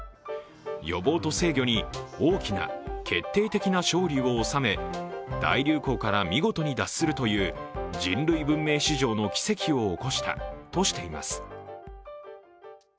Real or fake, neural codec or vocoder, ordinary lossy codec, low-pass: real; none; none; none